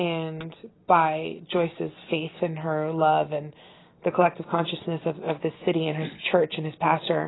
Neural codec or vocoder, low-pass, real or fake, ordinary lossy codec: none; 7.2 kHz; real; AAC, 16 kbps